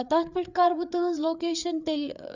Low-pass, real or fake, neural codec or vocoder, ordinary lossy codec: 7.2 kHz; fake; codec, 16 kHz, 4 kbps, FreqCodec, larger model; none